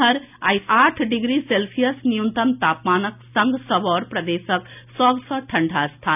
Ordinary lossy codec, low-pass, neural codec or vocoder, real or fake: none; 3.6 kHz; none; real